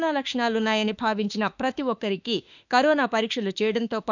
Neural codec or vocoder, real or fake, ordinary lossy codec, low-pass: autoencoder, 48 kHz, 32 numbers a frame, DAC-VAE, trained on Japanese speech; fake; none; 7.2 kHz